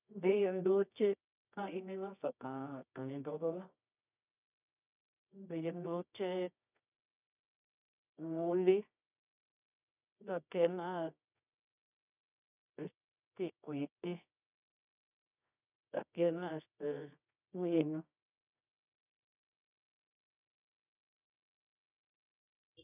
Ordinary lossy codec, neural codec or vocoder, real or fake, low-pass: none; codec, 24 kHz, 0.9 kbps, WavTokenizer, medium music audio release; fake; 3.6 kHz